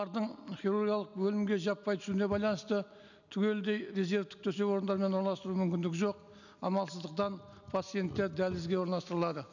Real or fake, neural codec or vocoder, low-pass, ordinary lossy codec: real; none; 7.2 kHz; none